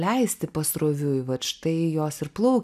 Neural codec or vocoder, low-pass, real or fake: none; 14.4 kHz; real